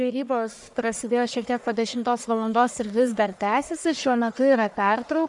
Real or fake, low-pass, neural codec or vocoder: fake; 10.8 kHz; codec, 44.1 kHz, 1.7 kbps, Pupu-Codec